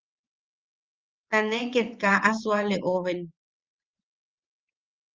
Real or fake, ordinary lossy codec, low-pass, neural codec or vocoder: fake; Opus, 32 kbps; 7.2 kHz; vocoder, 22.05 kHz, 80 mel bands, WaveNeXt